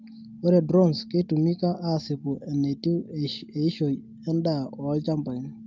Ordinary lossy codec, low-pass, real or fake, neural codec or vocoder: Opus, 24 kbps; 7.2 kHz; real; none